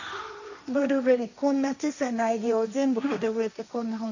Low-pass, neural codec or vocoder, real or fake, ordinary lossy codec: 7.2 kHz; codec, 16 kHz, 1.1 kbps, Voila-Tokenizer; fake; none